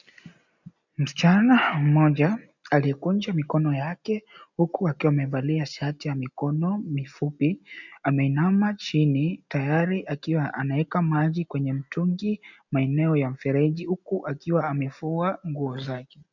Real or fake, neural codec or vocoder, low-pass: real; none; 7.2 kHz